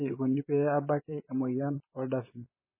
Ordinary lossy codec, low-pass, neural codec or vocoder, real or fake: MP3, 16 kbps; 3.6 kHz; vocoder, 44.1 kHz, 128 mel bands, Pupu-Vocoder; fake